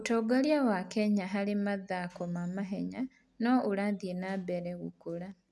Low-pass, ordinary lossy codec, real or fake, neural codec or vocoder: none; none; real; none